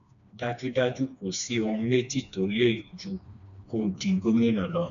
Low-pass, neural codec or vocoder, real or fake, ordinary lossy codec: 7.2 kHz; codec, 16 kHz, 2 kbps, FreqCodec, smaller model; fake; none